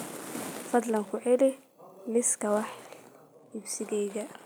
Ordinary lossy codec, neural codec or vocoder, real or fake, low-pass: none; none; real; none